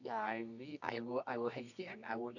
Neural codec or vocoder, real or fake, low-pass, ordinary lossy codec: codec, 24 kHz, 0.9 kbps, WavTokenizer, medium music audio release; fake; 7.2 kHz; none